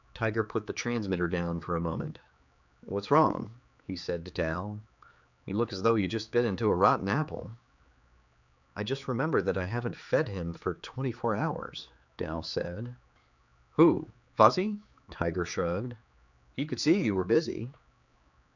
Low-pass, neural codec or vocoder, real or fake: 7.2 kHz; codec, 16 kHz, 4 kbps, X-Codec, HuBERT features, trained on general audio; fake